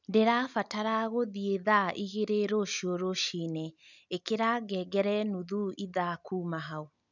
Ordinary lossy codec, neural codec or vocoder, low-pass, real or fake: none; none; 7.2 kHz; real